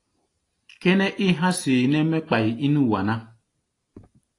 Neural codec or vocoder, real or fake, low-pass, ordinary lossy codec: none; real; 10.8 kHz; AAC, 48 kbps